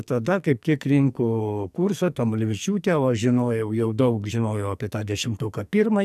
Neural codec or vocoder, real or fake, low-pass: codec, 44.1 kHz, 2.6 kbps, SNAC; fake; 14.4 kHz